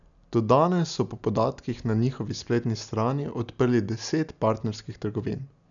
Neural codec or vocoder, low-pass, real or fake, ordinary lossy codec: none; 7.2 kHz; real; none